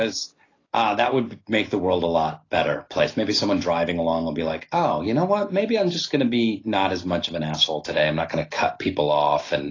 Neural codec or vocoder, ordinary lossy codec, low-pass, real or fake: none; AAC, 32 kbps; 7.2 kHz; real